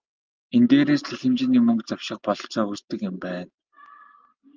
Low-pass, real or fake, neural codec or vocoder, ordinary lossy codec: 7.2 kHz; real; none; Opus, 24 kbps